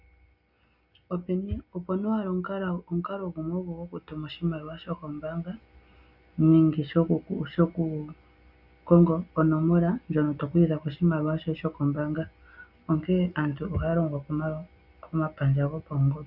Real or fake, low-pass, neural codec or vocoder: real; 5.4 kHz; none